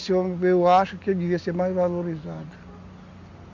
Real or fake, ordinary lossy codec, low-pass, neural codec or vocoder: real; MP3, 48 kbps; 7.2 kHz; none